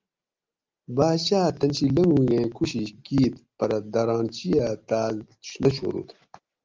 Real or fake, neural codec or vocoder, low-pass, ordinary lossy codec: real; none; 7.2 kHz; Opus, 32 kbps